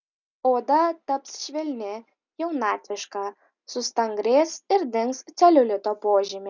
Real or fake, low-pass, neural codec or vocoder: real; 7.2 kHz; none